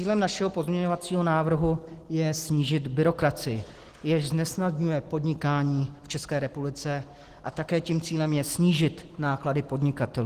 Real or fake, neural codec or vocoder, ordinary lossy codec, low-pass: real; none; Opus, 16 kbps; 14.4 kHz